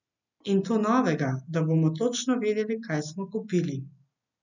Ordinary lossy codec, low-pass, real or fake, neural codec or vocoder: none; 7.2 kHz; real; none